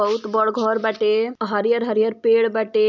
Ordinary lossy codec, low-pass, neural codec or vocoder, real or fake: none; 7.2 kHz; none; real